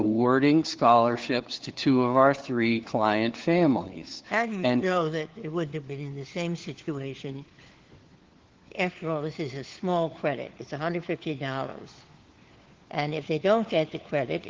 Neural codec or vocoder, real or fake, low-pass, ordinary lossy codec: codec, 16 kHz, 4 kbps, FunCodec, trained on LibriTTS, 50 frames a second; fake; 7.2 kHz; Opus, 16 kbps